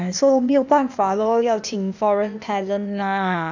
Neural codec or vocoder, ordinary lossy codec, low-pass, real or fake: codec, 16 kHz, 0.8 kbps, ZipCodec; none; 7.2 kHz; fake